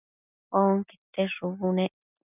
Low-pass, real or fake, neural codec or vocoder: 3.6 kHz; real; none